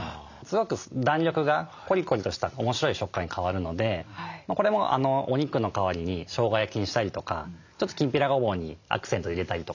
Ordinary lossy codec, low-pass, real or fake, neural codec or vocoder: none; 7.2 kHz; real; none